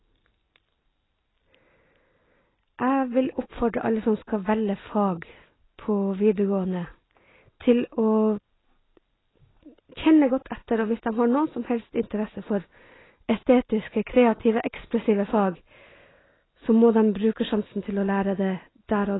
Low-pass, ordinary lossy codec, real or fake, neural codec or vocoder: 7.2 kHz; AAC, 16 kbps; real; none